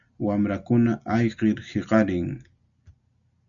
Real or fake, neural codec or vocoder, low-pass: real; none; 7.2 kHz